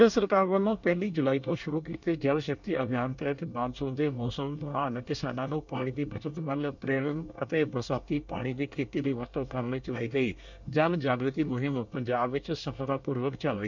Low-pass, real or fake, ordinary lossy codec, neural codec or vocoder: 7.2 kHz; fake; none; codec, 24 kHz, 1 kbps, SNAC